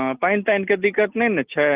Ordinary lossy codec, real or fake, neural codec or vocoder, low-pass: Opus, 24 kbps; real; none; 3.6 kHz